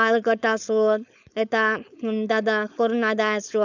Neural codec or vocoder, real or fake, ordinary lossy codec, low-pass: codec, 16 kHz, 4.8 kbps, FACodec; fake; none; 7.2 kHz